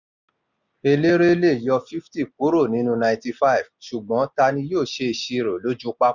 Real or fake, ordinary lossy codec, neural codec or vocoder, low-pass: real; none; none; 7.2 kHz